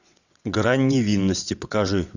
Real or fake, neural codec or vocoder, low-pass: fake; vocoder, 22.05 kHz, 80 mel bands, WaveNeXt; 7.2 kHz